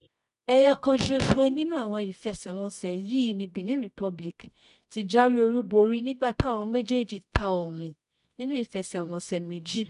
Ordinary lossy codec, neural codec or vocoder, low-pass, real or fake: none; codec, 24 kHz, 0.9 kbps, WavTokenizer, medium music audio release; 10.8 kHz; fake